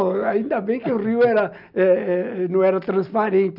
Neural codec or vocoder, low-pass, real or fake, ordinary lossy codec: none; 5.4 kHz; real; none